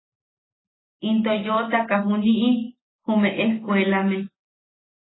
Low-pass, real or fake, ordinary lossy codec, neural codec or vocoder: 7.2 kHz; real; AAC, 16 kbps; none